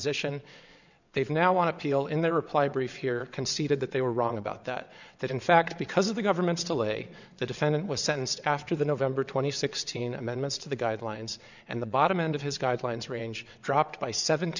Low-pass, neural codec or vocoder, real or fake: 7.2 kHz; vocoder, 22.05 kHz, 80 mel bands, WaveNeXt; fake